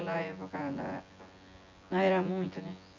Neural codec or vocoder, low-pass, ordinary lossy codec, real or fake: vocoder, 24 kHz, 100 mel bands, Vocos; 7.2 kHz; MP3, 64 kbps; fake